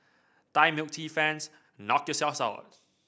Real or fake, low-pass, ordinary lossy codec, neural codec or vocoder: real; none; none; none